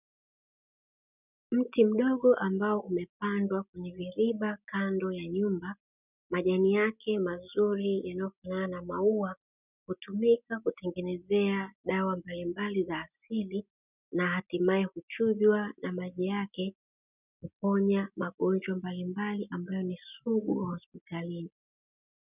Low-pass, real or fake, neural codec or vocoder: 3.6 kHz; real; none